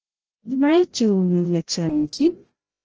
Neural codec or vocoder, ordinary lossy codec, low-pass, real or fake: codec, 16 kHz, 0.5 kbps, FreqCodec, larger model; Opus, 16 kbps; 7.2 kHz; fake